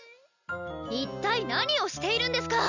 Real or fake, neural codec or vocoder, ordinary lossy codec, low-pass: real; none; none; 7.2 kHz